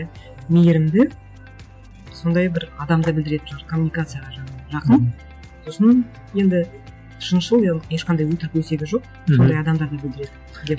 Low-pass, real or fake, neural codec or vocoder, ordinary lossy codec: none; real; none; none